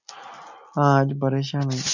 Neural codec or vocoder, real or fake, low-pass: none; real; 7.2 kHz